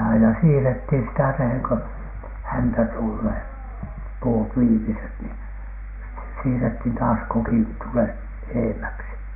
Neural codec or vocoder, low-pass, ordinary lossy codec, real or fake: none; 3.6 kHz; none; real